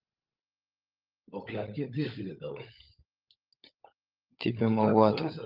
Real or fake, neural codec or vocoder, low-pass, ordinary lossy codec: fake; codec, 16 kHz, 16 kbps, FunCodec, trained on LibriTTS, 50 frames a second; 5.4 kHz; Opus, 32 kbps